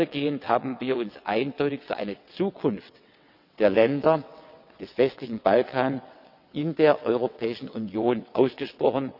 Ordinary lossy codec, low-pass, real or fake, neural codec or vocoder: none; 5.4 kHz; fake; vocoder, 22.05 kHz, 80 mel bands, WaveNeXt